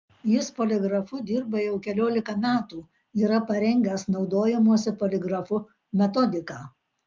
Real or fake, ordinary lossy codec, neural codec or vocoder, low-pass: real; Opus, 24 kbps; none; 7.2 kHz